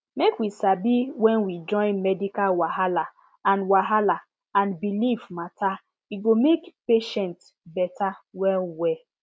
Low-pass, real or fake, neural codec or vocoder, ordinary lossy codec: none; real; none; none